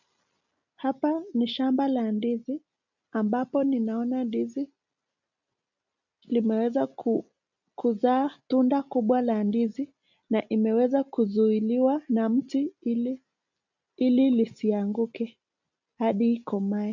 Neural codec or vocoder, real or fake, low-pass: none; real; 7.2 kHz